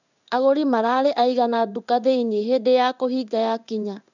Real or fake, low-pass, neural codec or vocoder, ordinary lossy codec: fake; 7.2 kHz; codec, 16 kHz in and 24 kHz out, 1 kbps, XY-Tokenizer; none